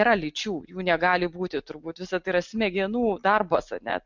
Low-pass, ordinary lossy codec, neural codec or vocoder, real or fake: 7.2 kHz; MP3, 64 kbps; none; real